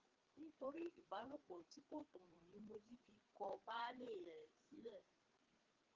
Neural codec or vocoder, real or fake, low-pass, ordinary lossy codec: codec, 16 kHz, 4 kbps, FreqCodec, larger model; fake; 7.2 kHz; Opus, 16 kbps